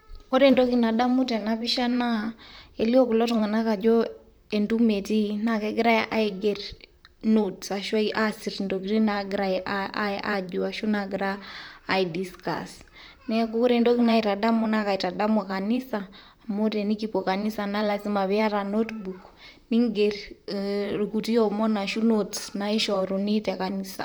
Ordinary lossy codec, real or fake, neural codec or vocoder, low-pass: none; fake; vocoder, 44.1 kHz, 128 mel bands, Pupu-Vocoder; none